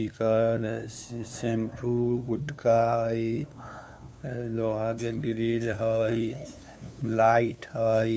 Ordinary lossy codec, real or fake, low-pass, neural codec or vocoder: none; fake; none; codec, 16 kHz, 2 kbps, FunCodec, trained on LibriTTS, 25 frames a second